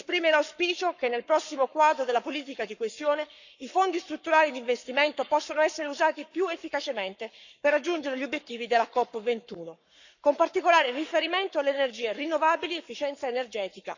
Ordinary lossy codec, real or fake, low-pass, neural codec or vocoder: none; fake; 7.2 kHz; codec, 44.1 kHz, 7.8 kbps, Pupu-Codec